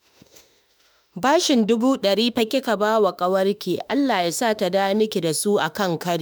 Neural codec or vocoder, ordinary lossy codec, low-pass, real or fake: autoencoder, 48 kHz, 32 numbers a frame, DAC-VAE, trained on Japanese speech; none; none; fake